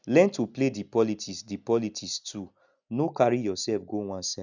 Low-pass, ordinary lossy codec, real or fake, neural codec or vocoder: 7.2 kHz; none; real; none